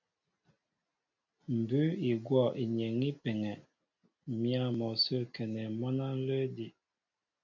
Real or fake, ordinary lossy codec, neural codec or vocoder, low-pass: real; AAC, 48 kbps; none; 7.2 kHz